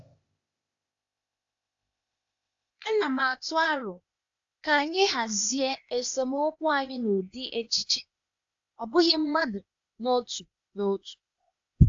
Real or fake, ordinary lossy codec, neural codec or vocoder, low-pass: fake; AAC, 64 kbps; codec, 16 kHz, 0.8 kbps, ZipCodec; 7.2 kHz